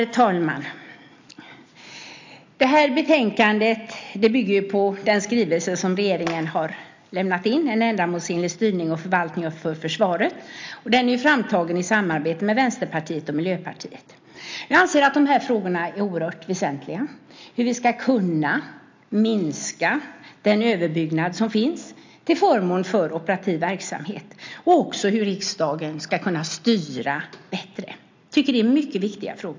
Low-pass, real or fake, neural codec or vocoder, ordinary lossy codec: 7.2 kHz; real; none; MP3, 64 kbps